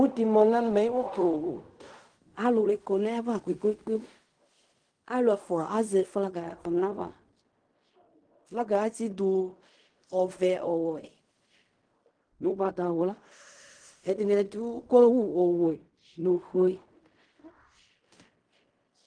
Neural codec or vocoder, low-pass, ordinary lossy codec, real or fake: codec, 16 kHz in and 24 kHz out, 0.4 kbps, LongCat-Audio-Codec, fine tuned four codebook decoder; 9.9 kHz; Opus, 24 kbps; fake